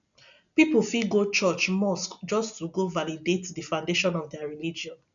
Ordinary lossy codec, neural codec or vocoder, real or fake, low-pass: none; none; real; 7.2 kHz